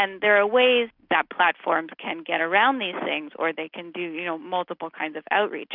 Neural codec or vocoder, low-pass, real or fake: none; 5.4 kHz; real